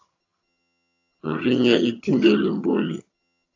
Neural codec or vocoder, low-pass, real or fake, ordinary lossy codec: vocoder, 22.05 kHz, 80 mel bands, HiFi-GAN; 7.2 kHz; fake; AAC, 48 kbps